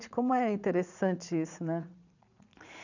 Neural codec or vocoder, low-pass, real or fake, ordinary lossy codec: vocoder, 44.1 kHz, 80 mel bands, Vocos; 7.2 kHz; fake; none